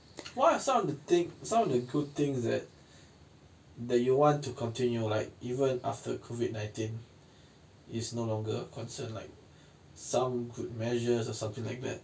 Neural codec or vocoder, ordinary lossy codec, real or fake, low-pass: none; none; real; none